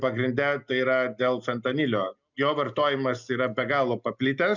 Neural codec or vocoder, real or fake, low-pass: none; real; 7.2 kHz